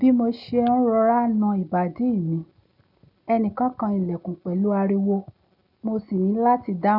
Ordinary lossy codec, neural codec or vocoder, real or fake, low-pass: none; none; real; 5.4 kHz